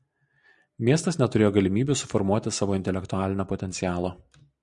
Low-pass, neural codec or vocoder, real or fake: 10.8 kHz; none; real